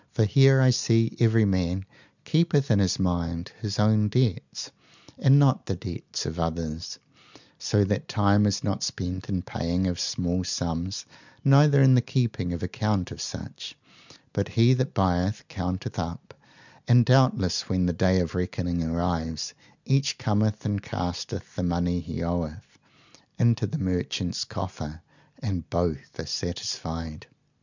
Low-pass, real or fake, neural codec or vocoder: 7.2 kHz; real; none